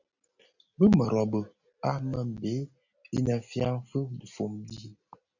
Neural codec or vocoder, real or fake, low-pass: none; real; 7.2 kHz